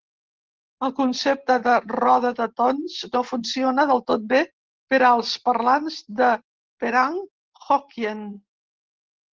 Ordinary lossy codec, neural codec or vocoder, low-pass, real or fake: Opus, 16 kbps; none; 7.2 kHz; real